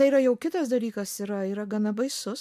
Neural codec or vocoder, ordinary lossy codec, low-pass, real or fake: none; MP3, 96 kbps; 14.4 kHz; real